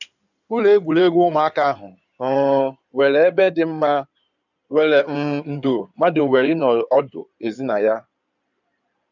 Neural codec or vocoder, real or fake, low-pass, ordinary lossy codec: codec, 16 kHz in and 24 kHz out, 2.2 kbps, FireRedTTS-2 codec; fake; 7.2 kHz; none